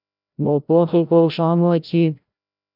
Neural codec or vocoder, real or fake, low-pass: codec, 16 kHz, 0.5 kbps, FreqCodec, larger model; fake; 5.4 kHz